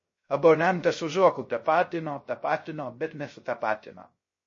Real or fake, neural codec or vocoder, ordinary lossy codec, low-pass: fake; codec, 16 kHz, 0.3 kbps, FocalCodec; MP3, 32 kbps; 7.2 kHz